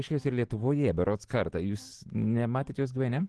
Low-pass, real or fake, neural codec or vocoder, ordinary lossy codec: 10.8 kHz; real; none; Opus, 16 kbps